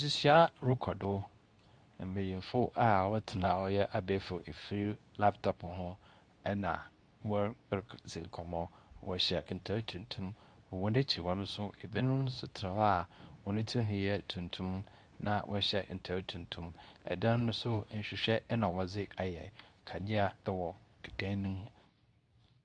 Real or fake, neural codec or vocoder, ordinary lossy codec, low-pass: fake; codec, 24 kHz, 0.9 kbps, WavTokenizer, medium speech release version 2; Opus, 64 kbps; 9.9 kHz